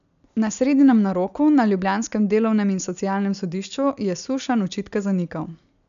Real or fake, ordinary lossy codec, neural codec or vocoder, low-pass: real; none; none; 7.2 kHz